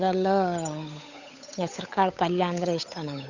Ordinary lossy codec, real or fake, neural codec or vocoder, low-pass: none; fake; codec, 16 kHz, 8 kbps, FunCodec, trained on Chinese and English, 25 frames a second; 7.2 kHz